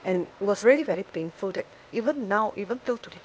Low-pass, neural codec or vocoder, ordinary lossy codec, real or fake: none; codec, 16 kHz, 0.8 kbps, ZipCodec; none; fake